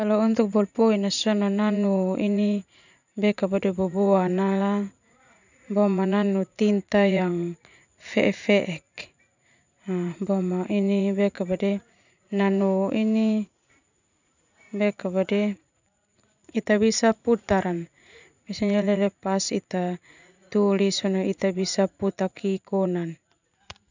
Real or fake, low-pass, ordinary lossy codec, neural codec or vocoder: fake; 7.2 kHz; none; vocoder, 44.1 kHz, 80 mel bands, Vocos